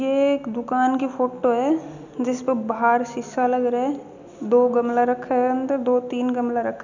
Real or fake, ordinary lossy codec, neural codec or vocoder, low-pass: real; none; none; 7.2 kHz